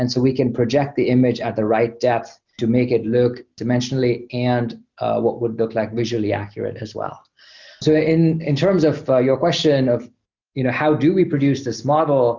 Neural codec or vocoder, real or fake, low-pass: none; real; 7.2 kHz